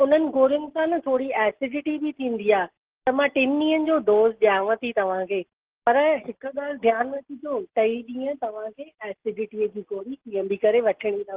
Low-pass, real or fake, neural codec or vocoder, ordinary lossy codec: 3.6 kHz; real; none; Opus, 16 kbps